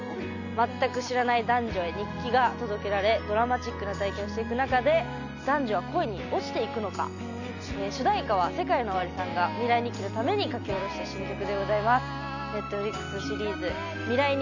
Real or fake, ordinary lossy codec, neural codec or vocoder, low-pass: real; none; none; 7.2 kHz